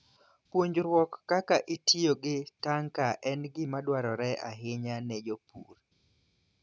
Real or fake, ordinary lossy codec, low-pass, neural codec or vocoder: real; none; none; none